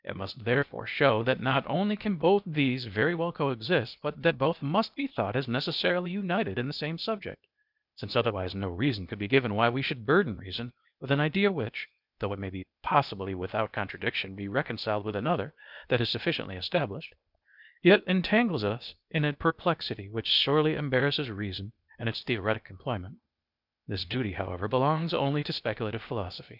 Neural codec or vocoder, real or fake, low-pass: codec, 16 kHz, 0.8 kbps, ZipCodec; fake; 5.4 kHz